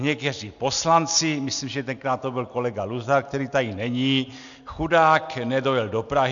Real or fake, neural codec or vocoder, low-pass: real; none; 7.2 kHz